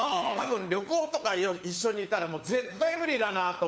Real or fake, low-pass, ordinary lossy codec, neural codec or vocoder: fake; none; none; codec, 16 kHz, 4 kbps, FunCodec, trained on LibriTTS, 50 frames a second